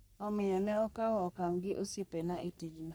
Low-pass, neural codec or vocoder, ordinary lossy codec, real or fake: none; codec, 44.1 kHz, 3.4 kbps, Pupu-Codec; none; fake